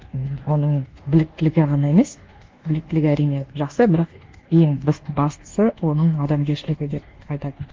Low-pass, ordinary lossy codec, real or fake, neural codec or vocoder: 7.2 kHz; Opus, 16 kbps; fake; codec, 24 kHz, 1.2 kbps, DualCodec